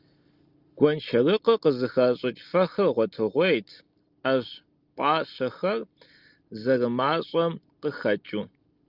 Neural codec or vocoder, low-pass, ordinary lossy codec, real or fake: none; 5.4 kHz; Opus, 32 kbps; real